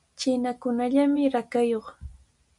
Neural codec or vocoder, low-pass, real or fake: none; 10.8 kHz; real